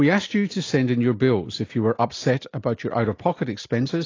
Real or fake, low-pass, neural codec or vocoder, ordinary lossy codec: real; 7.2 kHz; none; AAC, 32 kbps